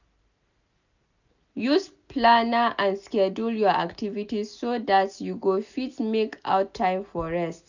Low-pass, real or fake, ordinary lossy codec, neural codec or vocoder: 7.2 kHz; real; none; none